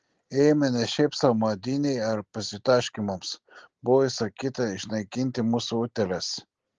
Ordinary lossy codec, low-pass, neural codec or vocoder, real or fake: Opus, 16 kbps; 7.2 kHz; none; real